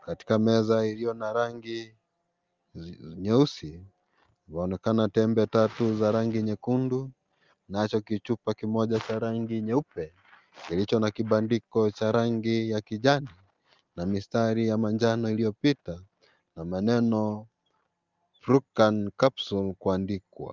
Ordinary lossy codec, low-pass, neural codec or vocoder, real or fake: Opus, 32 kbps; 7.2 kHz; none; real